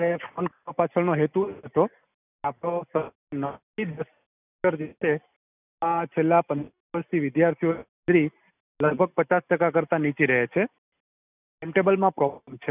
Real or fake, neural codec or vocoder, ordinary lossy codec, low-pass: real; none; none; 3.6 kHz